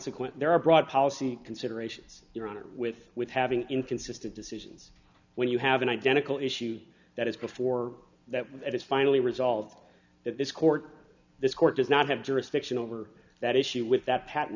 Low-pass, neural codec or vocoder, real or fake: 7.2 kHz; none; real